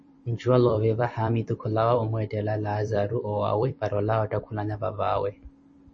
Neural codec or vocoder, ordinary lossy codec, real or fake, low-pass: vocoder, 44.1 kHz, 128 mel bands every 256 samples, BigVGAN v2; MP3, 32 kbps; fake; 9.9 kHz